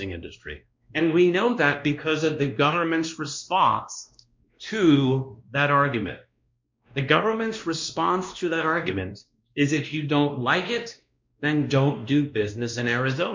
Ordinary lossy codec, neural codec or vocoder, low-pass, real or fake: MP3, 64 kbps; codec, 16 kHz, 1 kbps, X-Codec, WavLM features, trained on Multilingual LibriSpeech; 7.2 kHz; fake